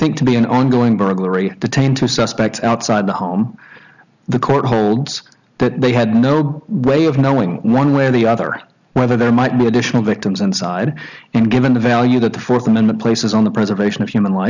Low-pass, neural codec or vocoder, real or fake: 7.2 kHz; none; real